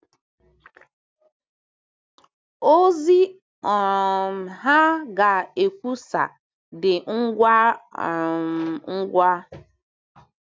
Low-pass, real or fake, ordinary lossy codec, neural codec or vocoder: none; real; none; none